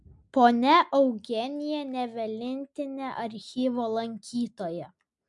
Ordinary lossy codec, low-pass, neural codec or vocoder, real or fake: MP3, 64 kbps; 10.8 kHz; none; real